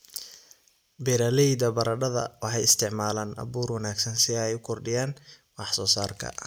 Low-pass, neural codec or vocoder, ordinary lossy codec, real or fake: none; none; none; real